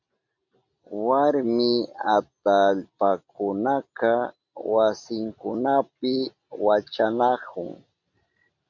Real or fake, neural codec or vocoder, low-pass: real; none; 7.2 kHz